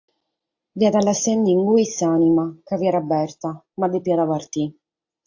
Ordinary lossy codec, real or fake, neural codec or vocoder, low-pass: AAC, 48 kbps; real; none; 7.2 kHz